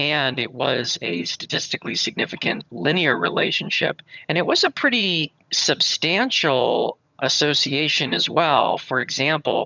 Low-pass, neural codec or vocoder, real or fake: 7.2 kHz; vocoder, 22.05 kHz, 80 mel bands, HiFi-GAN; fake